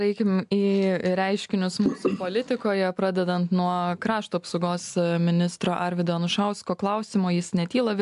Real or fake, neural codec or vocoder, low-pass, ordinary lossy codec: real; none; 10.8 kHz; AAC, 96 kbps